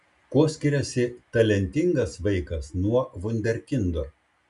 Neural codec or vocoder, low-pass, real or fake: none; 10.8 kHz; real